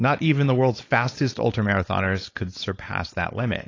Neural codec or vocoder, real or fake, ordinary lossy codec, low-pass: codec, 16 kHz, 4.8 kbps, FACodec; fake; AAC, 32 kbps; 7.2 kHz